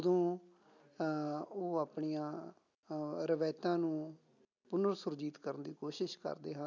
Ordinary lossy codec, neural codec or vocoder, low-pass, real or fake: none; none; 7.2 kHz; real